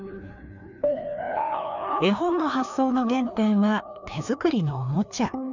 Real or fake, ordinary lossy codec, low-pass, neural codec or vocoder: fake; none; 7.2 kHz; codec, 16 kHz, 2 kbps, FreqCodec, larger model